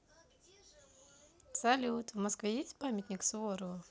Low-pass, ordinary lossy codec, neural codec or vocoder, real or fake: none; none; none; real